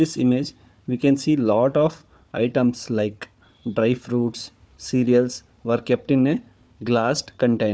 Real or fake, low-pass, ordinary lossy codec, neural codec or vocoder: fake; none; none; codec, 16 kHz, 4 kbps, FunCodec, trained on Chinese and English, 50 frames a second